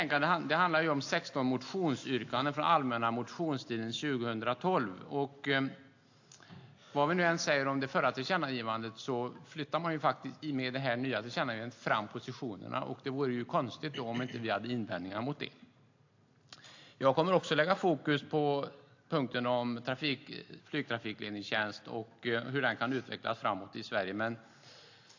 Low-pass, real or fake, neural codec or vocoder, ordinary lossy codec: 7.2 kHz; real; none; AAC, 48 kbps